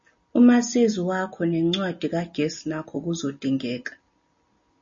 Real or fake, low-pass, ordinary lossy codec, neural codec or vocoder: real; 7.2 kHz; MP3, 32 kbps; none